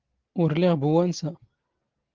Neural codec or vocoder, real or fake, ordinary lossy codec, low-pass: none; real; Opus, 16 kbps; 7.2 kHz